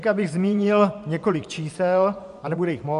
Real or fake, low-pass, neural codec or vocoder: fake; 10.8 kHz; vocoder, 24 kHz, 100 mel bands, Vocos